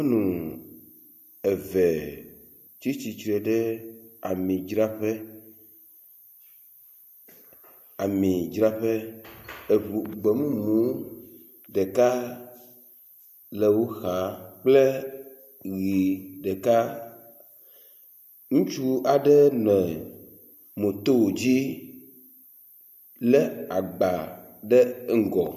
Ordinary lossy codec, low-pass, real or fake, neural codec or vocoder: MP3, 64 kbps; 14.4 kHz; real; none